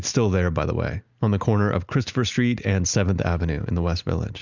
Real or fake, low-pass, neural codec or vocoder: real; 7.2 kHz; none